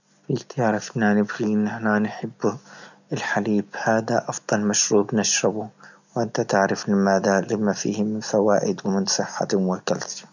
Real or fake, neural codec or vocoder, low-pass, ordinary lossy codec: real; none; 7.2 kHz; none